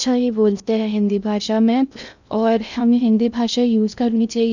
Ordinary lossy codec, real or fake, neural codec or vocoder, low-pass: none; fake; codec, 16 kHz in and 24 kHz out, 0.6 kbps, FocalCodec, streaming, 2048 codes; 7.2 kHz